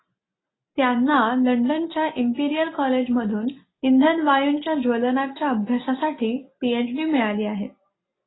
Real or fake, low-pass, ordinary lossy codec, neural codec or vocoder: real; 7.2 kHz; AAC, 16 kbps; none